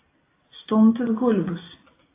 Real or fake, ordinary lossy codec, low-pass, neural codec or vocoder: real; AAC, 16 kbps; 3.6 kHz; none